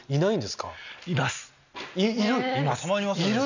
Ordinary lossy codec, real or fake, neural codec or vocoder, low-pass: none; real; none; 7.2 kHz